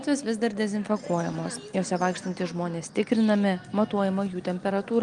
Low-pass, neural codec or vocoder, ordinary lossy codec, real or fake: 9.9 kHz; none; Opus, 32 kbps; real